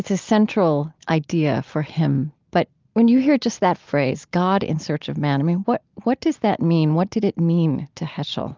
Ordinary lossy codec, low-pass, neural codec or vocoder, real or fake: Opus, 24 kbps; 7.2 kHz; none; real